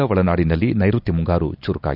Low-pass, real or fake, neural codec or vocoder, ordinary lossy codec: 5.4 kHz; real; none; none